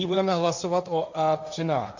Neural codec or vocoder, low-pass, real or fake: codec, 16 kHz, 1.1 kbps, Voila-Tokenizer; 7.2 kHz; fake